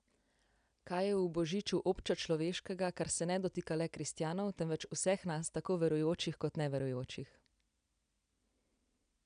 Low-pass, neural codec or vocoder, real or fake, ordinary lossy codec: 9.9 kHz; none; real; none